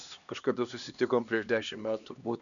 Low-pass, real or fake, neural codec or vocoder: 7.2 kHz; fake; codec, 16 kHz, 2 kbps, X-Codec, HuBERT features, trained on LibriSpeech